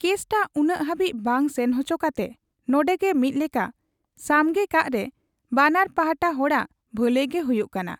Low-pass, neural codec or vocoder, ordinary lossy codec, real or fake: 19.8 kHz; none; none; real